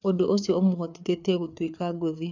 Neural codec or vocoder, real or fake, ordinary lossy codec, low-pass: codec, 16 kHz, 4 kbps, FreqCodec, larger model; fake; none; 7.2 kHz